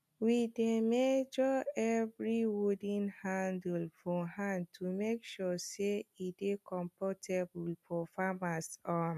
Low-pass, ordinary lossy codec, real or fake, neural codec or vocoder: 14.4 kHz; none; real; none